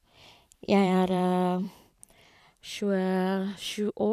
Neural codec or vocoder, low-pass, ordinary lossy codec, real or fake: vocoder, 44.1 kHz, 128 mel bands every 512 samples, BigVGAN v2; 14.4 kHz; none; fake